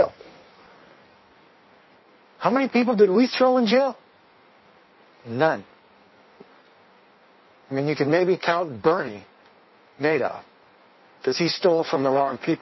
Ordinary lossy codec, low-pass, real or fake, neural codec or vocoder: MP3, 24 kbps; 7.2 kHz; fake; codec, 16 kHz in and 24 kHz out, 1.1 kbps, FireRedTTS-2 codec